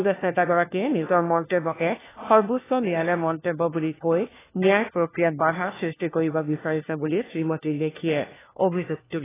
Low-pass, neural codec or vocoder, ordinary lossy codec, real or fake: 3.6 kHz; codec, 16 kHz, 1 kbps, FunCodec, trained on LibriTTS, 50 frames a second; AAC, 16 kbps; fake